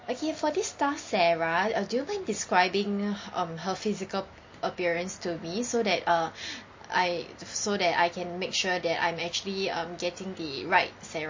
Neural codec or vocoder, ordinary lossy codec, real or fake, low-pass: none; MP3, 32 kbps; real; 7.2 kHz